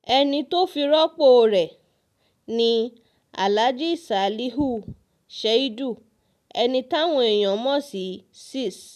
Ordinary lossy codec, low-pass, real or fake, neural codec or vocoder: none; 14.4 kHz; real; none